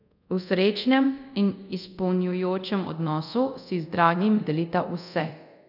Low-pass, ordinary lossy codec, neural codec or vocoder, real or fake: 5.4 kHz; none; codec, 24 kHz, 0.5 kbps, DualCodec; fake